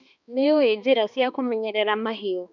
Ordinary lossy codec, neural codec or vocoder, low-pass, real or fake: none; codec, 16 kHz, 2 kbps, X-Codec, HuBERT features, trained on balanced general audio; 7.2 kHz; fake